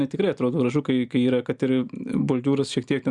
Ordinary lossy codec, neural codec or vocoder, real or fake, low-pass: Opus, 64 kbps; none; real; 10.8 kHz